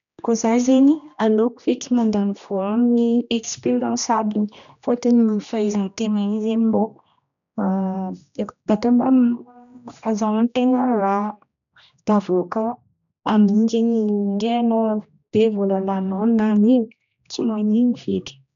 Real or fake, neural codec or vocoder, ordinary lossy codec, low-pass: fake; codec, 16 kHz, 1 kbps, X-Codec, HuBERT features, trained on general audio; none; 7.2 kHz